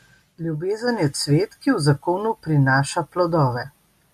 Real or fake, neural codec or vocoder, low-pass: real; none; 14.4 kHz